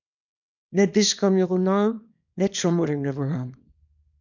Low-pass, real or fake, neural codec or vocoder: 7.2 kHz; fake; codec, 24 kHz, 0.9 kbps, WavTokenizer, small release